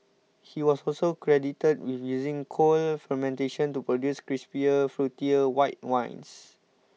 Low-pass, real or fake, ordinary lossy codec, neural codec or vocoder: none; real; none; none